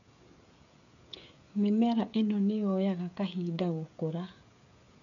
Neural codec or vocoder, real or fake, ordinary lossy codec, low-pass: codec, 16 kHz, 16 kbps, FreqCodec, smaller model; fake; none; 7.2 kHz